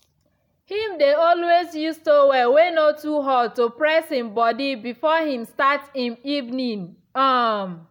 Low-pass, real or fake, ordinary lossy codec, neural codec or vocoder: 19.8 kHz; real; none; none